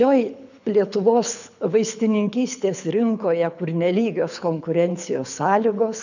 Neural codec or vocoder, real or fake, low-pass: codec, 24 kHz, 6 kbps, HILCodec; fake; 7.2 kHz